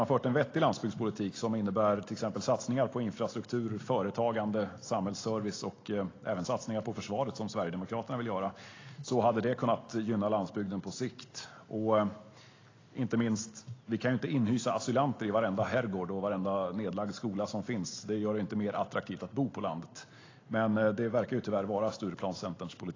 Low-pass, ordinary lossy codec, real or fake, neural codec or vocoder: 7.2 kHz; AAC, 32 kbps; real; none